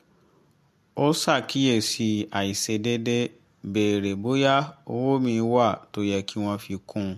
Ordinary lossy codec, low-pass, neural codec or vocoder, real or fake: MP3, 64 kbps; 14.4 kHz; none; real